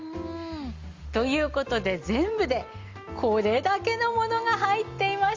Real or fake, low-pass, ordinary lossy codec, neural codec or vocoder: real; 7.2 kHz; Opus, 32 kbps; none